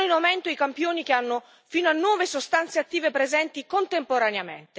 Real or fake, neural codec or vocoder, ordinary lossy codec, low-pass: real; none; none; none